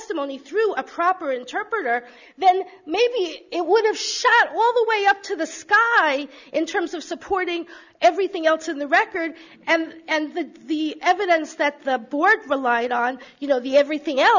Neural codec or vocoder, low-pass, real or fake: none; 7.2 kHz; real